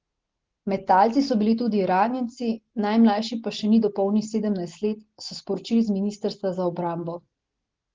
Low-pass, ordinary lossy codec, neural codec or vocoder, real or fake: 7.2 kHz; Opus, 16 kbps; none; real